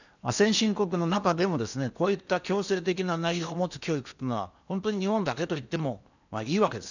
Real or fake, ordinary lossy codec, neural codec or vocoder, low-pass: fake; none; codec, 16 kHz in and 24 kHz out, 0.8 kbps, FocalCodec, streaming, 65536 codes; 7.2 kHz